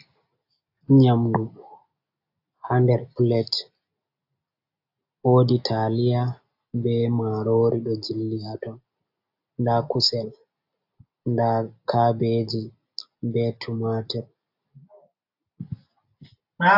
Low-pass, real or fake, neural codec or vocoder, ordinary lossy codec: 5.4 kHz; real; none; AAC, 48 kbps